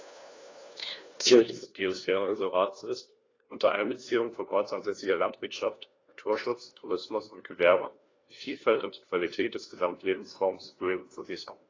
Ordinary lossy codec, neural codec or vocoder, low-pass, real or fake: AAC, 32 kbps; codec, 16 kHz, 1 kbps, FunCodec, trained on LibriTTS, 50 frames a second; 7.2 kHz; fake